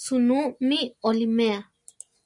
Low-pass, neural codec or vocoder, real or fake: 10.8 kHz; none; real